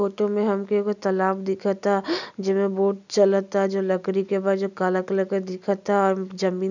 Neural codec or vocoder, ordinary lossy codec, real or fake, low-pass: none; none; real; 7.2 kHz